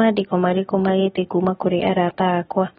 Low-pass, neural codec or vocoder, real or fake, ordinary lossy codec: 19.8 kHz; none; real; AAC, 16 kbps